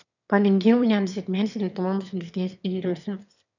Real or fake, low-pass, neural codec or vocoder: fake; 7.2 kHz; autoencoder, 22.05 kHz, a latent of 192 numbers a frame, VITS, trained on one speaker